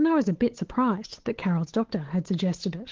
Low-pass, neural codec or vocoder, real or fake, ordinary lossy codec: 7.2 kHz; codec, 16 kHz, 8 kbps, FunCodec, trained on Chinese and English, 25 frames a second; fake; Opus, 16 kbps